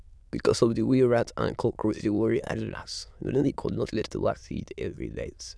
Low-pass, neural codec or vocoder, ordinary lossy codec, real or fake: none; autoencoder, 22.05 kHz, a latent of 192 numbers a frame, VITS, trained on many speakers; none; fake